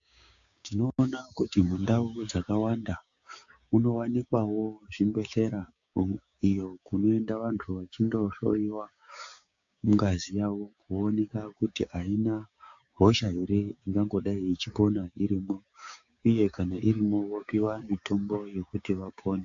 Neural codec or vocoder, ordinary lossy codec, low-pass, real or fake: codec, 16 kHz, 6 kbps, DAC; AAC, 64 kbps; 7.2 kHz; fake